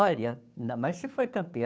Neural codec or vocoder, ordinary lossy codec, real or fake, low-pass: codec, 16 kHz, 2 kbps, FunCodec, trained on Chinese and English, 25 frames a second; none; fake; none